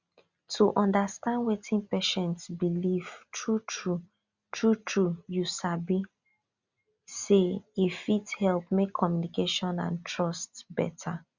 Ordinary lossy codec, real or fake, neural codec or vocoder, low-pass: Opus, 64 kbps; real; none; 7.2 kHz